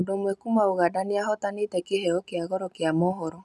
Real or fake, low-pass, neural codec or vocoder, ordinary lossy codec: real; none; none; none